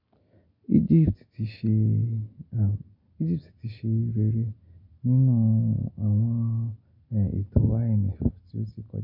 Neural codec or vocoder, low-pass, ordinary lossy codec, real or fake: none; 5.4 kHz; MP3, 48 kbps; real